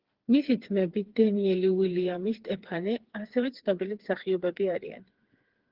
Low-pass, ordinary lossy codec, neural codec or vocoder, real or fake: 5.4 kHz; Opus, 16 kbps; codec, 16 kHz, 4 kbps, FreqCodec, smaller model; fake